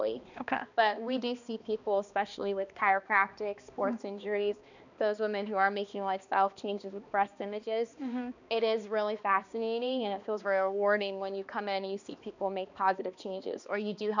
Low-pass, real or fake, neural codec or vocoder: 7.2 kHz; fake; codec, 16 kHz, 2 kbps, X-Codec, HuBERT features, trained on balanced general audio